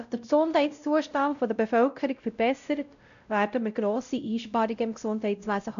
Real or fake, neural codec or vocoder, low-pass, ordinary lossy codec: fake; codec, 16 kHz, 0.5 kbps, X-Codec, WavLM features, trained on Multilingual LibriSpeech; 7.2 kHz; none